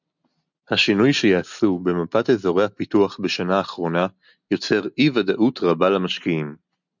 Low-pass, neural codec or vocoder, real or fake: 7.2 kHz; none; real